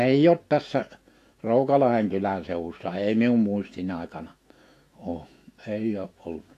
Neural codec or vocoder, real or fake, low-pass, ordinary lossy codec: autoencoder, 48 kHz, 128 numbers a frame, DAC-VAE, trained on Japanese speech; fake; 14.4 kHz; AAC, 64 kbps